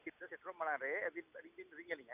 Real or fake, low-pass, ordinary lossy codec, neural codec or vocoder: real; 3.6 kHz; none; none